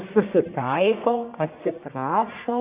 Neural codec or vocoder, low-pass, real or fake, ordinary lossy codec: codec, 44.1 kHz, 1.7 kbps, Pupu-Codec; 3.6 kHz; fake; Opus, 64 kbps